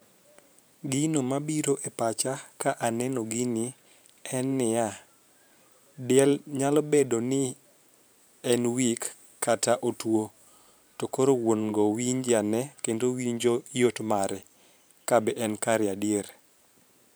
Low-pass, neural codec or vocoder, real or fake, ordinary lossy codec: none; vocoder, 44.1 kHz, 128 mel bands every 512 samples, BigVGAN v2; fake; none